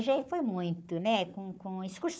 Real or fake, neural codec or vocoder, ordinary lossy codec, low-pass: fake; codec, 16 kHz, 4 kbps, FunCodec, trained on Chinese and English, 50 frames a second; none; none